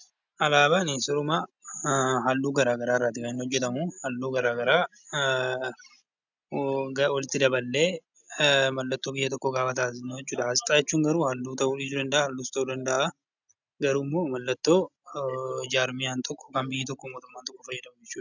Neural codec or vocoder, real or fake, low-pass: none; real; 7.2 kHz